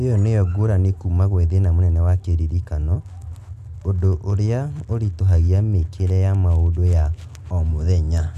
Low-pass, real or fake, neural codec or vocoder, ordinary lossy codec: 14.4 kHz; real; none; none